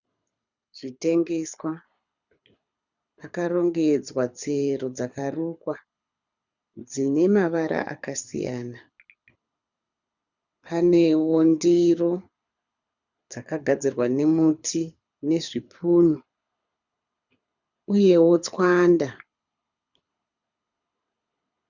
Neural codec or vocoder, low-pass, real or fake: codec, 24 kHz, 6 kbps, HILCodec; 7.2 kHz; fake